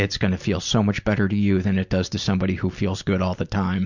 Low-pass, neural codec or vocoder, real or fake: 7.2 kHz; none; real